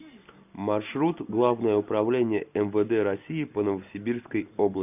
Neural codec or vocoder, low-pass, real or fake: none; 3.6 kHz; real